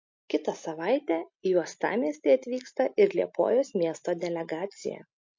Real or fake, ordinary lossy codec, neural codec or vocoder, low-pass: real; MP3, 48 kbps; none; 7.2 kHz